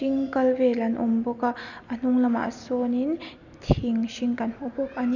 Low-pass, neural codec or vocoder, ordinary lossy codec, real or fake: 7.2 kHz; none; none; real